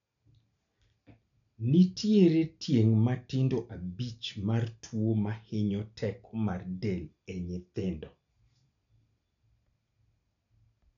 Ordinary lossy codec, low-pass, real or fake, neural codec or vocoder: none; 7.2 kHz; real; none